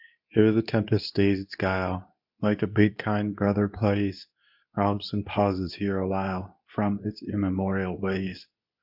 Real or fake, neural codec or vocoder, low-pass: fake; codec, 24 kHz, 0.9 kbps, WavTokenizer, medium speech release version 2; 5.4 kHz